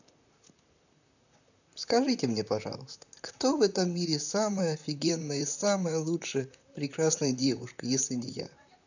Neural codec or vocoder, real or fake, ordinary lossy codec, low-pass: vocoder, 44.1 kHz, 128 mel bands, Pupu-Vocoder; fake; none; 7.2 kHz